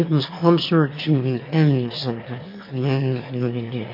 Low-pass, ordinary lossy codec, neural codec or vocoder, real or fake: 5.4 kHz; MP3, 32 kbps; autoencoder, 22.05 kHz, a latent of 192 numbers a frame, VITS, trained on one speaker; fake